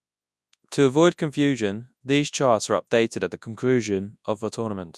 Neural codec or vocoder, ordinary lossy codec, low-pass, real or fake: codec, 24 kHz, 0.9 kbps, WavTokenizer, large speech release; none; none; fake